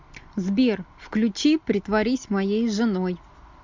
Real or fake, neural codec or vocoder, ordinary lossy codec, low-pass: real; none; MP3, 64 kbps; 7.2 kHz